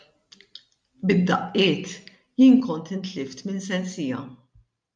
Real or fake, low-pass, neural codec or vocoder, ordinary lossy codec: real; 9.9 kHz; none; MP3, 96 kbps